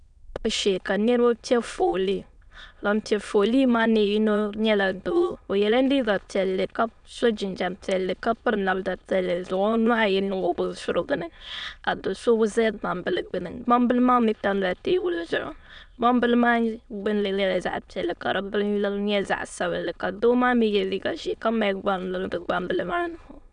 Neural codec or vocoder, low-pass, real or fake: autoencoder, 22.05 kHz, a latent of 192 numbers a frame, VITS, trained on many speakers; 9.9 kHz; fake